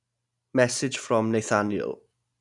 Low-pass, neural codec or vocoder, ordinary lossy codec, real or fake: 10.8 kHz; none; none; real